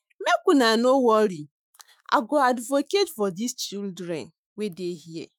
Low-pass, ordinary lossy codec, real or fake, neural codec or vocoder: none; none; fake; autoencoder, 48 kHz, 128 numbers a frame, DAC-VAE, trained on Japanese speech